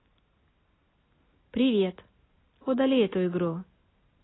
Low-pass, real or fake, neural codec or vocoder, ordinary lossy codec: 7.2 kHz; real; none; AAC, 16 kbps